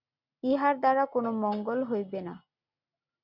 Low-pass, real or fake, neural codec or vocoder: 5.4 kHz; real; none